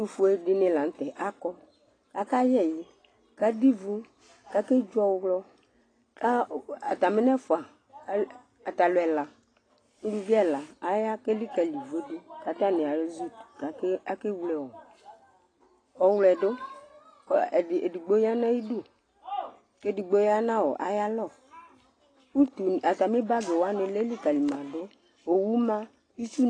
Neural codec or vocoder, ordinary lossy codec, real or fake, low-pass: none; AAC, 32 kbps; real; 9.9 kHz